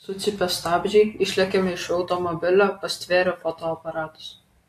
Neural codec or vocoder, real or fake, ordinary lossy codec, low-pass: none; real; AAC, 64 kbps; 14.4 kHz